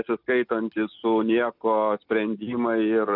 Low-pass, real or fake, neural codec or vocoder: 5.4 kHz; real; none